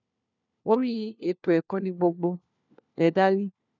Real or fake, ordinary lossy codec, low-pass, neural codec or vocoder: fake; none; 7.2 kHz; codec, 16 kHz, 1 kbps, FunCodec, trained on LibriTTS, 50 frames a second